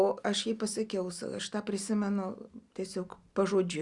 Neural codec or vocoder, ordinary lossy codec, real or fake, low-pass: none; Opus, 64 kbps; real; 10.8 kHz